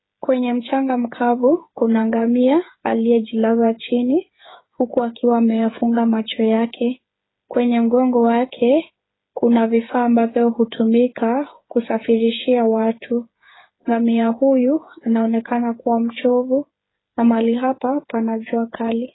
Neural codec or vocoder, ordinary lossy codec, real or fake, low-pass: codec, 16 kHz, 8 kbps, FreqCodec, smaller model; AAC, 16 kbps; fake; 7.2 kHz